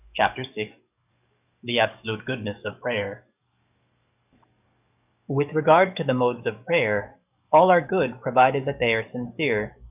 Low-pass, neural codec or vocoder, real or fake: 3.6 kHz; codec, 44.1 kHz, 7.8 kbps, DAC; fake